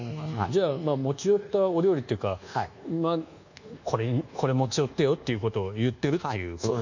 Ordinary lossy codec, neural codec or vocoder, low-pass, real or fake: none; codec, 24 kHz, 1.2 kbps, DualCodec; 7.2 kHz; fake